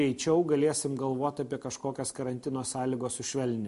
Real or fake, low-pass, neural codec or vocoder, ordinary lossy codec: real; 14.4 kHz; none; MP3, 48 kbps